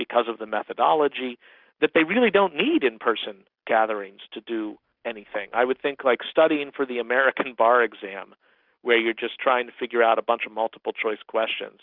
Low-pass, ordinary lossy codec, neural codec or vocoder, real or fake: 5.4 kHz; Opus, 64 kbps; none; real